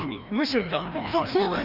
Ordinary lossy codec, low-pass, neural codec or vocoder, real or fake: Opus, 64 kbps; 5.4 kHz; codec, 16 kHz, 1 kbps, FreqCodec, larger model; fake